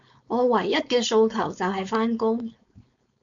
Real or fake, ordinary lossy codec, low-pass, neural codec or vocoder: fake; MP3, 64 kbps; 7.2 kHz; codec, 16 kHz, 4.8 kbps, FACodec